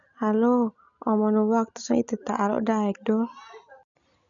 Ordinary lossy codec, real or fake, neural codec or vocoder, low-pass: none; real; none; 7.2 kHz